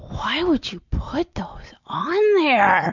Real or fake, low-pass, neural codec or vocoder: real; 7.2 kHz; none